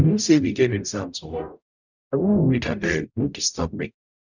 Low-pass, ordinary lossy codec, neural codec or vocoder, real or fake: 7.2 kHz; none; codec, 44.1 kHz, 0.9 kbps, DAC; fake